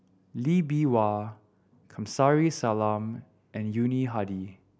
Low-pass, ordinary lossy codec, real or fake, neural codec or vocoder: none; none; real; none